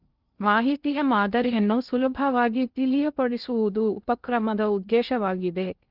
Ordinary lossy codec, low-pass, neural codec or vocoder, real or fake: Opus, 24 kbps; 5.4 kHz; codec, 16 kHz in and 24 kHz out, 0.6 kbps, FocalCodec, streaming, 2048 codes; fake